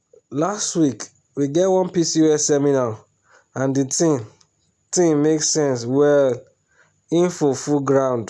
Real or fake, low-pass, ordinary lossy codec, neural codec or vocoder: real; none; none; none